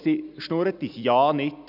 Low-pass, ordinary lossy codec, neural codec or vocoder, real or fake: 5.4 kHz; none; none; real